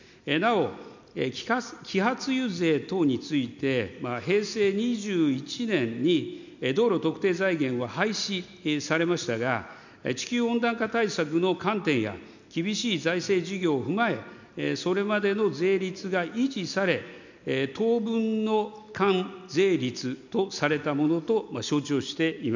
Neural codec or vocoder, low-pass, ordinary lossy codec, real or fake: none; 7.2 kHz; none; real